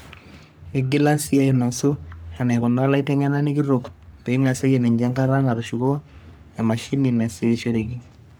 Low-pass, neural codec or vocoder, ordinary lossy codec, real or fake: none; codec, 44.1 kHz, 3.4 kbps, Pupu-Codec; none; fake